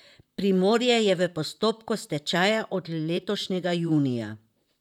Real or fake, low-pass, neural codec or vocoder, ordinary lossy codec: fake; 19.8 kHz; vocoder, 44.1 kHz, 128 mel bands, Pupu-Vocoder; none